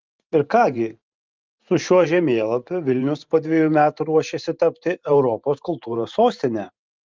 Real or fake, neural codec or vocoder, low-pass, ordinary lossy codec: fake; vocoder, 44.1 kHz, 128 mel bands every 512 samples, BigVGAN v2; 7.2 kHz; Opus, 32 kbps